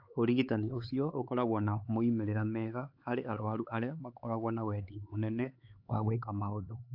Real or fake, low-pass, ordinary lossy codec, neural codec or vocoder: fake; 5.4 kHz; AAC, 48 kbps; codec, 16 kHz, 4 kbps, X-Codec, HuBERT features, trained on LibriSpeech